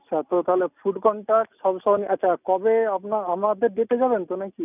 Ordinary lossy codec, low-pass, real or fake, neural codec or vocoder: none; 3.6 kHz; real; none